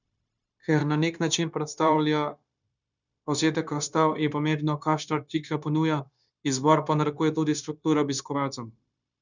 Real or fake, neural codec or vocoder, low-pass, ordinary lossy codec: fake; codec, 16 kHz, 0.9 kbps, LongCat-Audio-Codec; 7.2 kHz; none